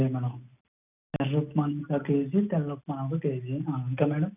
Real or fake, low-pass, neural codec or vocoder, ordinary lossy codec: real; 3.6 kHz; none; none